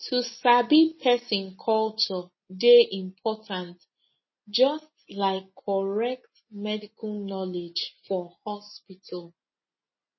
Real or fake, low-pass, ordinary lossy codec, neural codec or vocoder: real; 7.2 kHz; MP3, 24 kbps; none